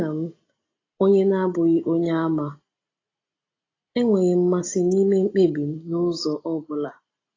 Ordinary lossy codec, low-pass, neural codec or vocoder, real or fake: AAC, 32 kbps; 7.2 kHz; none; real